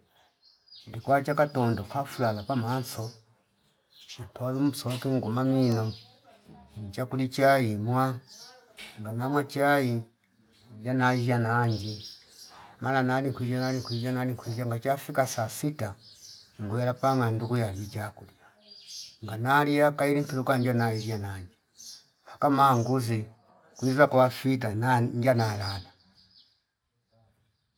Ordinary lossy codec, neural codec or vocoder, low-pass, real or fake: none; none; 19.8 kHz; real